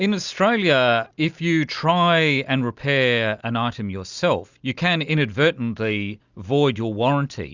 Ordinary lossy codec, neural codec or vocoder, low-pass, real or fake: Opus, 64 kbps; none; 7.2 kHz; real